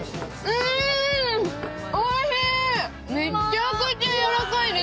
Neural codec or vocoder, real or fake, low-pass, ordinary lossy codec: none; real; none; none